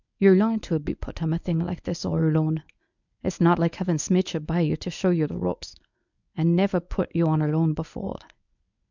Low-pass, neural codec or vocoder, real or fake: 7.2 kHz; codec, 24 kHz, 0.9 kbps, WavTokenizer, medium speech release version 1; fake